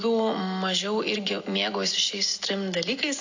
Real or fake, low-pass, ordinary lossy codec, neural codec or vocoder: real; 7.2 kHz; AAC, 48 kbps; none